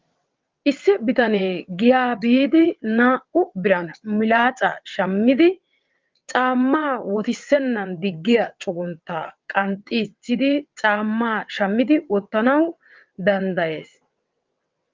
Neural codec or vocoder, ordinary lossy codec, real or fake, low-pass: vocoder, 22.05 kHz, 80 mel bands, WaveNeXt; Opus, 32 kbps; fake; 7.2 kHz